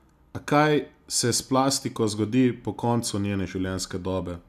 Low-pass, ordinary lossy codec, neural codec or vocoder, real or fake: 14.4 kHz; none; none; real